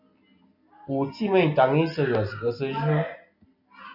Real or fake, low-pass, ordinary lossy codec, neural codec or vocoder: real; 5.4 kHz; AAC, 48 kbps; none